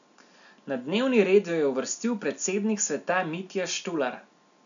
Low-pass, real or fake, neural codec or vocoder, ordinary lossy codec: 7.2 kHz; real; none; none